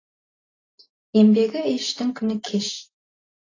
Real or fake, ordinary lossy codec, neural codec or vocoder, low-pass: real; AAC, 32 kbps; none; 7.2 kHz